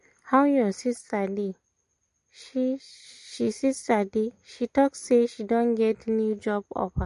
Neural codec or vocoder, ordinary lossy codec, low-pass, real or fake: none; MP3, 48 kbps; 10.8 kHz; real